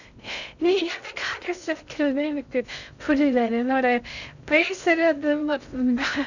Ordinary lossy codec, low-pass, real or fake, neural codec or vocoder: none; 7.2 kHz; fake; codec, 16 kHz in and 24 kHz out, 0.6 kbps, FocalCodec, streaming, 2048 codes